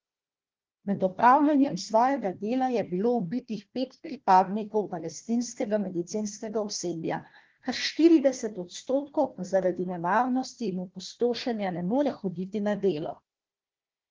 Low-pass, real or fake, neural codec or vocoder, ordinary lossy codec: 7.2 kHz; fake; codec, 16 kHz, 1 kbps, FunCodec, trained on Chinese and English, 50 frames a second; Opus, 16 kbps